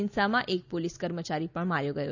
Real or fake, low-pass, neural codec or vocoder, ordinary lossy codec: real; 7.2 kHz; none; none